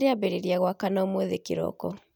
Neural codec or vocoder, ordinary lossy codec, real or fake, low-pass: none; none; real; none